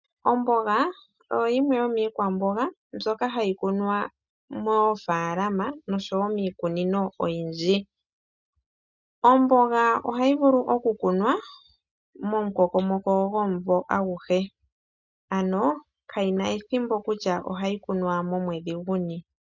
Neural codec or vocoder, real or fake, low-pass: none; real; 7.2 kHz